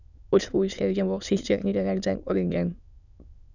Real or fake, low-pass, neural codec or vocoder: fake; 7.2 kHz; autoencoder, 22.05 kHz, a latent of 192 numbers a frame, VITS, trained on many speakers